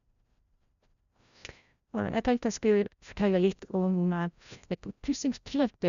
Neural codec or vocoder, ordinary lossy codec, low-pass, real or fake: codec, 16 kHz, 0.5 kbps, FreqCodec, larger model; none; 7.2 kHz; fake